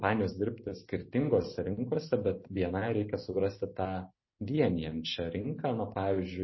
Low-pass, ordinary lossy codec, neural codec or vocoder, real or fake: 7.2 kHz; MP3, 24 kbps; none; real